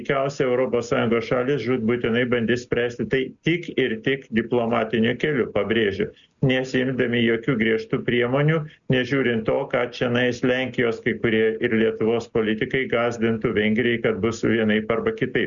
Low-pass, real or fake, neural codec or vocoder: 7.2 kHz; real; none